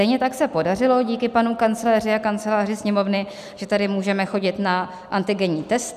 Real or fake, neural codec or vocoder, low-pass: real; none; 14.4 kHz